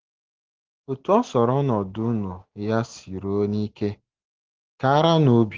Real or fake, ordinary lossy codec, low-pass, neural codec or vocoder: real; Opus, 16 kbps; 7.2 kHz; none